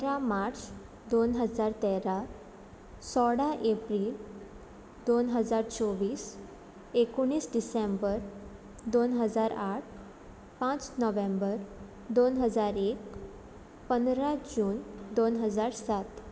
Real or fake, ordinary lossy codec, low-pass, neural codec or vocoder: real; none; none; none